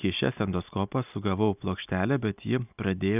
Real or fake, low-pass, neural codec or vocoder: real; 3.6 kHz; none